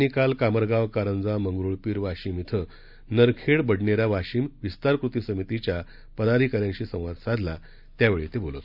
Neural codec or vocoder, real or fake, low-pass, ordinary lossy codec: none; real; 5.4 kHz; none